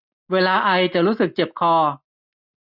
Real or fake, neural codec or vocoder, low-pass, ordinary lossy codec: real; none; 5.4 kHz; none